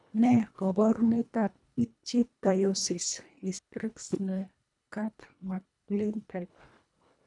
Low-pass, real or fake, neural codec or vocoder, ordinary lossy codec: 10.8 kHz; fake; codec, 24 kHz, 1.5 kbps, HILCodec; none